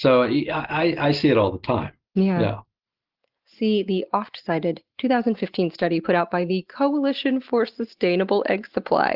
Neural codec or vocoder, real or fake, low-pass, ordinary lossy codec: none; real; 5.4 kHz; Opus, 16 kbps